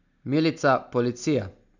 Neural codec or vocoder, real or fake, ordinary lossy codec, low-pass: none; real; none; 7.2 kHz